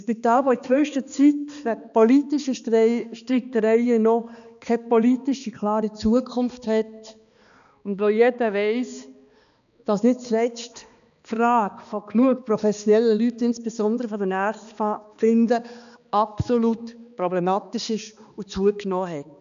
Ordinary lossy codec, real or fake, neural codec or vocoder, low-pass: none; fake; codec, 16 kHz, 2 kbps, X-Codec, HuBERT features, trained on balanced general audio; 7.2 kHz